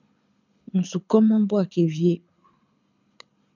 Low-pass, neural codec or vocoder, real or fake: 7.2 kHz; codec, 24 kHz, 6 kbps, HILCodec; fake